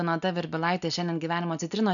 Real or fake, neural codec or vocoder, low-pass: real; none; 7.2 kHz